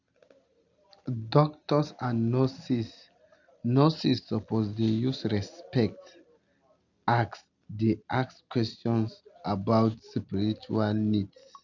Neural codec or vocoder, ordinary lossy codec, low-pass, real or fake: none; none; 7.2 kHz; real